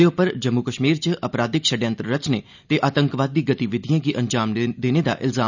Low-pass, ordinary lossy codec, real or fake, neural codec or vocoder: 7.2 kHz; none; real; none